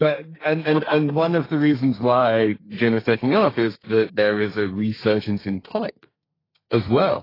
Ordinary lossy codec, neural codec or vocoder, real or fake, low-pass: AAC, 24 kbps; codec, 32 kHz, 1.9 kbps, SNAC; fake; 5.4 kHz